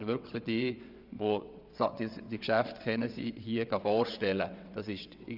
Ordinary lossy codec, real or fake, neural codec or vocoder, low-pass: none; fake; vocoder, 22.05 kHz, 80 mel bands, WaveNeXt; 5.4 kHz